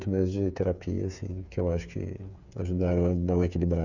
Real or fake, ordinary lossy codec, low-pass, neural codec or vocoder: fake; none; 7.2 kHz; codec, 16 kHz, 8 kbps, FreqCodec, smaller model